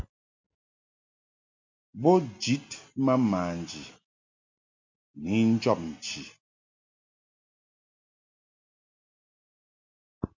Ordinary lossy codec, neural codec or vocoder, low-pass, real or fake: MP3, 48 kbps; none; 7.2 kHz; real